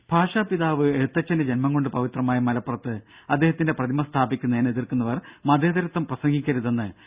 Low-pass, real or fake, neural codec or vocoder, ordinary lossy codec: 3.6 kHz; real; none; Opus, 64 kbps